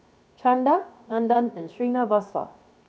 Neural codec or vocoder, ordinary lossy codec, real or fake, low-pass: codec, 16 kHz, 0.7 kbps, FocalCodec; none; fake; none